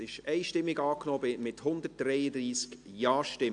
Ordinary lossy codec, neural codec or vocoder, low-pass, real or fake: AAC, 64 kbps; none; 9.9 kHz; real